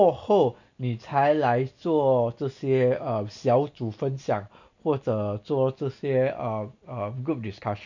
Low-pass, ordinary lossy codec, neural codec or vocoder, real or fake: 7.2 kHz; none; none; real